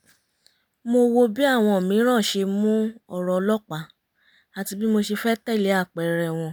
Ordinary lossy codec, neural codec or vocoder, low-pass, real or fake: none; none; none; real